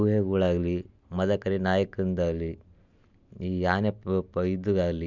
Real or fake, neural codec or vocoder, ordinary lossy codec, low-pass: real; none; none; 7.2 kHz